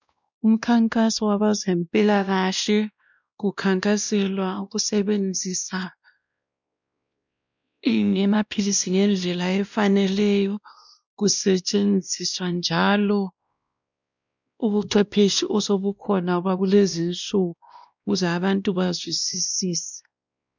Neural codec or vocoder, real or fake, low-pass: codec, 16 kHz, 1 kbps, X-Codec, WavLM features, trained on Multilingual LibriSpeech; fake; 7.2 kHz